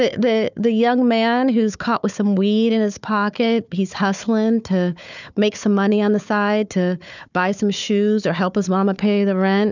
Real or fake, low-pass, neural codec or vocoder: fake; 7.2 kHz; codec, 16 kHz, 4 kbps, FunCodec, trained on Chinese and English, 50 frames a second